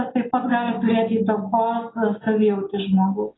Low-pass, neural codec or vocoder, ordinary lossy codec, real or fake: 7.2 kHz; none; AAC, 16 kbps; real